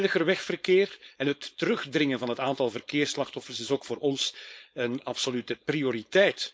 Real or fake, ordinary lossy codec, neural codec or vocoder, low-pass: fake; none; codec, 16 kHz, 4.8 kbps, FACodec; none